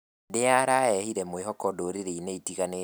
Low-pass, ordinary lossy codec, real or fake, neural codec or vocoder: none; none; real; none